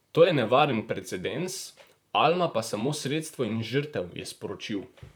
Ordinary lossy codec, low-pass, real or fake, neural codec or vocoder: none; none; fake; vocoder, 44.1 kHz, 128 mel bands, Pupu-Vocoder